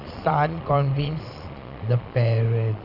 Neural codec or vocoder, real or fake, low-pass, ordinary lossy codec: vocoder, 22.05 kHz, 80 mel bands, Vocos; fake; 5.4 kHz; AAC, 48 kbps